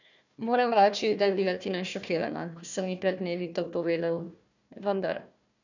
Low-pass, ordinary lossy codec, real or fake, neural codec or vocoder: 7.2 kHz; none; fake; codec, 16 kHz, 1 kbps, FunCodec, trained on Chinese and English, 50 frames a second